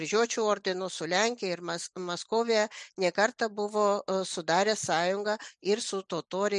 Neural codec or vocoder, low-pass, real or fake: none; 10.8 kHz; real